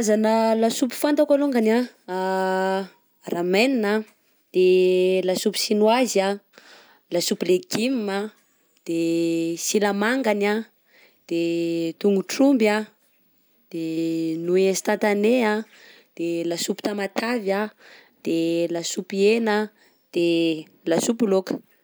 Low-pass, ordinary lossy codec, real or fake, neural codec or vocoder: none; none; real; none